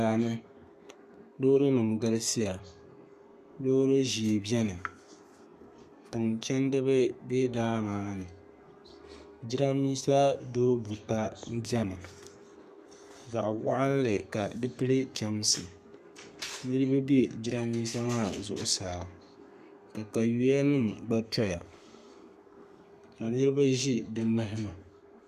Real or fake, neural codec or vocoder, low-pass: fake; codec, 32 kHz, 1.9 kbps, SNAC; 14.4 kHz